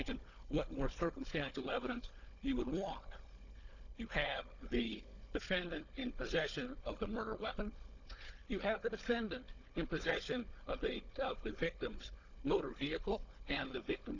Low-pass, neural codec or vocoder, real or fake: 7.2 kHz; codec, 16 kHz, 4 kbps, FunCodec, trained on Chinese and English, 50 frames a second; fake